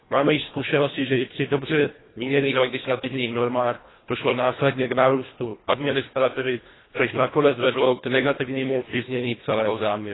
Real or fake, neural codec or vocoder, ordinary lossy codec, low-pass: fake; codec, 24 kHz, 1.5 kbps, HILCodec; AAC, 16 kbps; 7.2 kHz